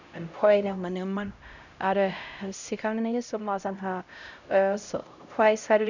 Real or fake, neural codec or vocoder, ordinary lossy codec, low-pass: fake; codec, 16 kHz, 0.5 kbps, X-Codec, HuBERT features, trained on LibriSpeech; none; 7.2 kHz